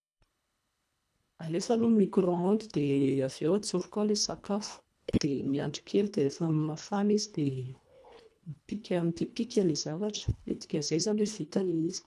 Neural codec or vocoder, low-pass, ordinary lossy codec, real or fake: codec, 24 kHz, 1.5 kbps, HILCodec; none; none; fake